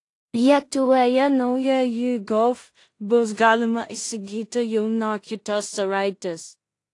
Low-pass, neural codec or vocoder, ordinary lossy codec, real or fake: 10.8 kHz; codec, 16 kHz in and 24 kHz out, 0.4 kbps, LongCat-Audio-Codec, two codebook decoder; AAC, 48 kbps; fake